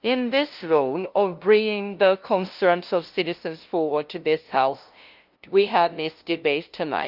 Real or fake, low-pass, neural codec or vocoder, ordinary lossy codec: fake; 5.4 kHz; codec, 16 kHz, 0.5 kbps, FunCodec, trained on LibriTTS, 25 frames a second; Opus, 24 kbps